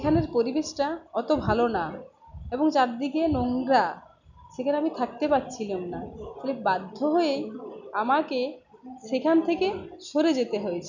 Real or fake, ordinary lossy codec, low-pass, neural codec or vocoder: real; none; 7.2 kHz; none